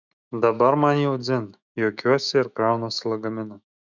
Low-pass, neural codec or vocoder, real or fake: 7.2 kHz; autoencoder, 48 kHz, 128 numbers a frame, DAC-VAE, trained on Japanese speech; fake